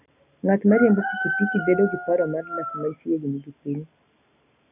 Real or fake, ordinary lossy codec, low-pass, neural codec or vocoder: real; none; 3.6 kHz; none